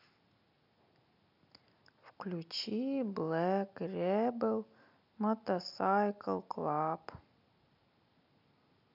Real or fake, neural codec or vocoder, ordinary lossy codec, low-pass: real; none; none; 5.4 kHz